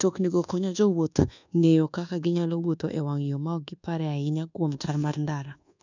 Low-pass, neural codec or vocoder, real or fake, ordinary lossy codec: 7.2 kHz; codec, 24 kHz, 1.2 kbps, DualCodec; fake; none